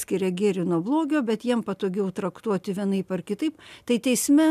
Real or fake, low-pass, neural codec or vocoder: real; 14.4 kHz; none